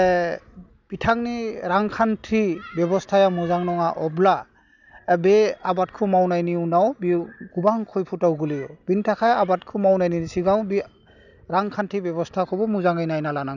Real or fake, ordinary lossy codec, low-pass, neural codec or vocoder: real; none; 7.2 kHz; none